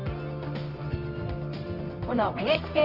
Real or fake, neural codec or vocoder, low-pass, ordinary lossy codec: fake; codec, 24 kHz, 0.9 kbps, WavTokenizer, medium music audio release; 5.4 kHz; Opus, 24 kbps